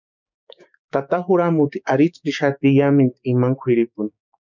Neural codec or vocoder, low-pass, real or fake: codec, 16 kHz, 6 kbps, DAC; 7.2 kHz; fake